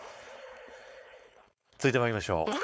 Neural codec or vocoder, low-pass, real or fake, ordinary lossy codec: codec, 16 kHz, 4.8 kbps, FACodec; none; fake; none